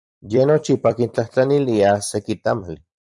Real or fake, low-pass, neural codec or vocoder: fake; 10.8 kHz; vocoder, 44.1 kHz, 128 mel bands every 256 samples, BigVGAN v2